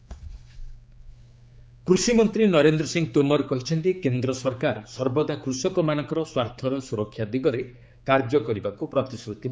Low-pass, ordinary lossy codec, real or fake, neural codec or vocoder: none; none; fake; codec, 16 kHz, 4 kbps, X-Codec, HuBERT features, trained on general audio